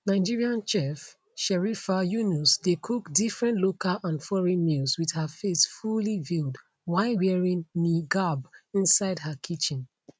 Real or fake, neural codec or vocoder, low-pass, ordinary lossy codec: real; none; none; none